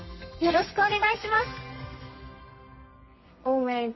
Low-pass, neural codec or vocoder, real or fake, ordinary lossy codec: 7.2 kHz; codec, 32 kHz, 1.9 kbps, SNAC; fake; MP3, 24 kbps